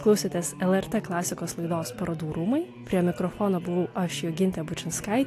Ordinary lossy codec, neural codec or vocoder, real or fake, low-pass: AAC, 64 kbps; none; real; 14.4 kHz